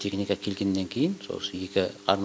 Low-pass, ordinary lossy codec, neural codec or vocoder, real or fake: none; none; none; real